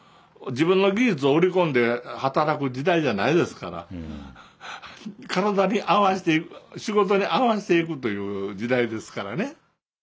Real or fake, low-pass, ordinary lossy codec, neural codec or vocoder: real; none; none; none